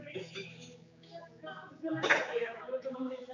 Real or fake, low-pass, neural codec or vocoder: fake; 7.2 kHz; codec, 16 kHz, 4 kbps, X-Codec, HuBERT features, trained on general audio